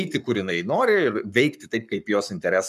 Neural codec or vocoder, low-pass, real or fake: codec, 44.1 kHz, 7.8 kbps, Pupu-Codec; 14.4 kHz; fake